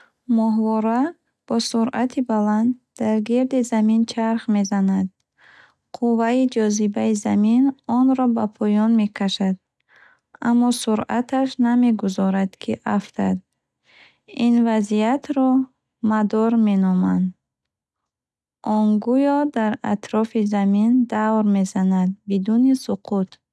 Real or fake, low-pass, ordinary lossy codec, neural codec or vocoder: real; none; none; none